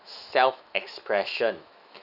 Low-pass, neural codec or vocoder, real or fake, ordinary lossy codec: 5.4 kHz; autoencoder, 48 kHz, 128 numbers a frame, DAC-VAE, trained on Japanese speech; fake; none